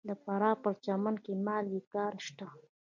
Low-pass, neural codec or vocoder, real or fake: 7.2 kHz; none; real